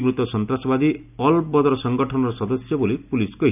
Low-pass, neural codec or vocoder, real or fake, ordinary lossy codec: 3.6 kHz; none; real; none